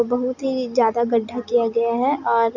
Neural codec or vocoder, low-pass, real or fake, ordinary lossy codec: none; 7.2 kHz; real; none